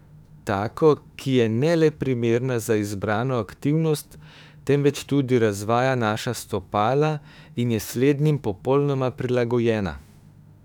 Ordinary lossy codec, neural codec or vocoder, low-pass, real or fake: none; autoencoder, 48 kHz, 32 numbers a frame, DAC-VAE, trained on Japanese speech; 19.8 kHz; fake